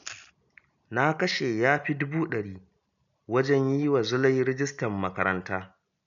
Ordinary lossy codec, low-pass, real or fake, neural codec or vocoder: none; 7.2 kHz; real; none